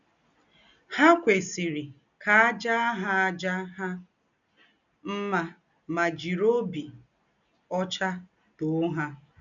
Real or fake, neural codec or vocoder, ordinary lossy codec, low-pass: real; none; none; 7.2 kHz